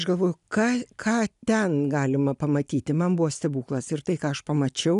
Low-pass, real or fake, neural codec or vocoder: 10.8 kHz; real; none